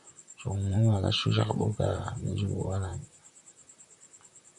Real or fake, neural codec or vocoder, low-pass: fake; vocoder, 44.1 kHz, 128 mel bands, Pupu-Vocoder; 10.8 kHz